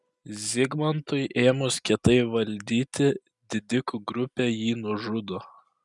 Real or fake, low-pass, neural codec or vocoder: real; 10.8 kHz; none